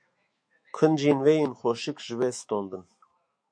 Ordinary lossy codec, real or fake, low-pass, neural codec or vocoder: MP3, 48 kbps; fake; 9.9 kHz; autoencoder, 48 kHz, 128 numbers a frame, DAC-VAE, trained on Japanese speech